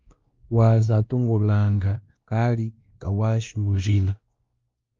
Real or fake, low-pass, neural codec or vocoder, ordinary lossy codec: fake; 7.2 kHz; codec, 16 kHz, 1 kbps, X-Codec, WavLM features, trained on Multilingual LibriSpeech; Opus, 32 kbps